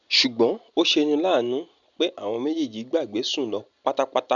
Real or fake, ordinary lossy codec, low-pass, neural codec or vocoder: real; none; 7.2 kHz; none